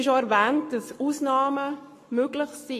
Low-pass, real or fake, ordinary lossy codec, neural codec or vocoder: 14.4 kHz; fake; AAC, 48 kbps; codec, 44.1 kHz, 7.8 kbps, Pupu-Codec